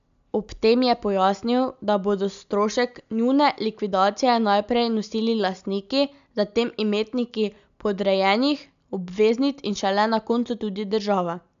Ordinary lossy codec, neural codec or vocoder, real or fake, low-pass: AAC, 96 kbps; none; real; 7.2 kHz